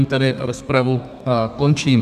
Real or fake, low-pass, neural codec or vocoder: fake; 14.4 kHz; codec, 32 kHz, 1.9 kbps, SNAC